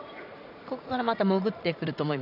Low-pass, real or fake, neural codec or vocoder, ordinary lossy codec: 5.4 kHz; fake; vocoder, 44.1 kHz, 80 mel bands, Vocos; none